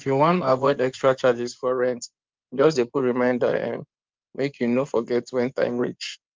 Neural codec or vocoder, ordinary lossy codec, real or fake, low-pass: codec, 16 kHz in and 24 kHz out, 2.2 kbps, FireRedTTS-2 codec; Opus, 24 kbps; fake; 7.2 kHz